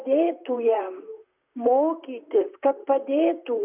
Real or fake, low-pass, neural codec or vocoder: fake; 3.6 kHz; vocoder, 44.1 kHz, 128 mel bands, Pupu-Vocoder